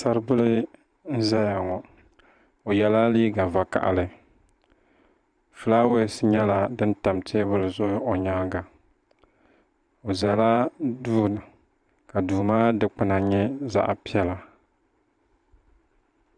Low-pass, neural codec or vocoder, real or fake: 9.9 kHz; vocoder, 44.1 kHz, 128 mel bands every 512 samples, BigVGAN v2; fake